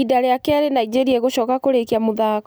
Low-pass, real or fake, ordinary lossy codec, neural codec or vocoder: none; real; none; none